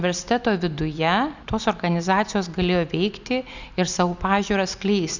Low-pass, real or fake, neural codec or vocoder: 7.2 kHz; real; none